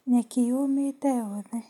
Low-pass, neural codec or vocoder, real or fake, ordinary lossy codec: 19.8 kHz; none; real; MP3, 96 kbps